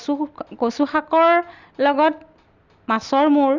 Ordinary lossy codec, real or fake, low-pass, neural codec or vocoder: Opus, 64 kbps; real; 7.2 kHz; none